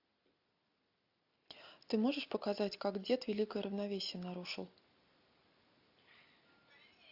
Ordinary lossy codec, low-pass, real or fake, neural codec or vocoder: AAC, 48 kbps; 5.4 kHz; real; none